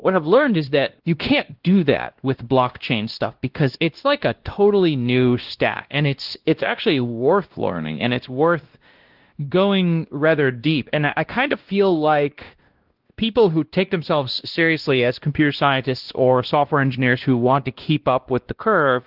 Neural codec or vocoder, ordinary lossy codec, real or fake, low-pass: codec, 16 kHz, 1 kbps, X-Codec, WavLM features, trained on Multilingual LibriSpeech; Opus, 16 kbps; fake; 5.4 kHz